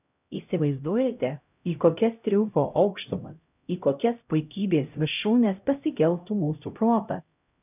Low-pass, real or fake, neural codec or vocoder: 3.6 kHz; fake; codec, 16 kHz, 0.5 kbps, X-Codec, HuBERT features, trained on LibriSpeech